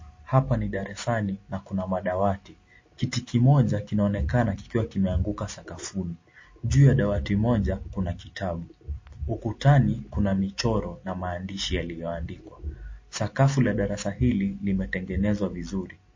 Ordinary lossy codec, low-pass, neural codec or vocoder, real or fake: MP3, 32 kbps; 7.2 kHz; none; real